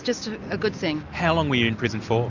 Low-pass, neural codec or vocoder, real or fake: 7.2 kHz; none; real